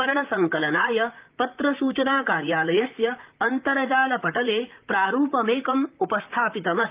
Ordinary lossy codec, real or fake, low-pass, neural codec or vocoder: Opus, 24 kbps; fake; 3.6 kHz; vocoder, 44.1 kHz, 128 mel bands, Pupu-Vocoder